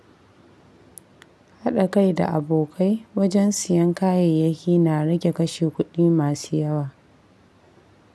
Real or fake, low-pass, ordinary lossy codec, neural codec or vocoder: real; none; none; none